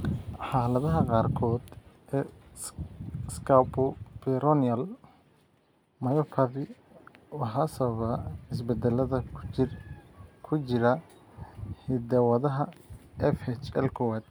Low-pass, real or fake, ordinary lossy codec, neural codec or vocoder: none; real; none; none